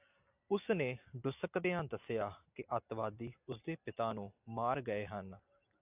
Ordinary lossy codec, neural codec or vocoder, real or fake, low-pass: AAC, 32 kbps; none; real; 3.6 kHz